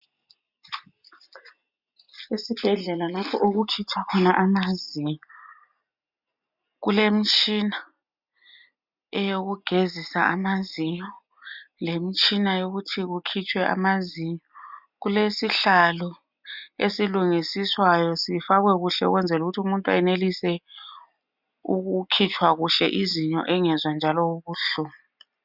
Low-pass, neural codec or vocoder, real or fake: 5.4 kHz; none; real